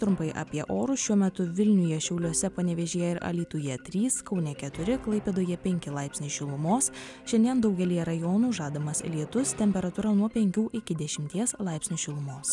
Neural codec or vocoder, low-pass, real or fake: none; 10.8 kHz; real